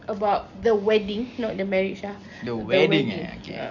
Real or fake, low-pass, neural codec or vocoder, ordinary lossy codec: real; 7.2 kHz; none; none